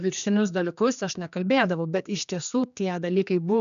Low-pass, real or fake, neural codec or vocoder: 7.2 kHz; fake; codec, 16 kHz, 2 kbps, X-Codec, HuBERT features, trained on general audio